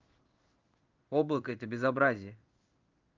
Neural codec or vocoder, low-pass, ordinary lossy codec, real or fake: codec, 16 kHz in and 24 kHz out, 1 kbps, XY-Tokenizer; 7.2 kHz; Opus, 24 kbps; fake